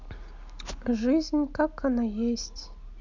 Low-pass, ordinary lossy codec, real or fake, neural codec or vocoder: 7.2 kHz; none; real; none